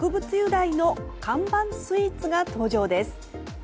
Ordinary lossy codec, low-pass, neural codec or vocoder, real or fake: none; none; none; real